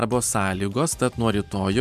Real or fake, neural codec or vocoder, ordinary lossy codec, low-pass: fake; vocoder, 44.1 kHz, 128 mel bands every 512 samples, BigVGAN v2; MP3, 96 kbps; 14.4 kHz